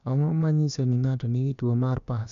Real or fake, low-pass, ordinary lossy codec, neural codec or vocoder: fake; 7.2 kHz; none; codec, 16 kHz, about 1 kbps, DyCAST, with the encoder's durations